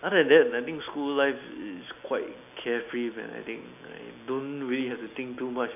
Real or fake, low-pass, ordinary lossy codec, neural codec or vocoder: real; 3.6 kHz; none; none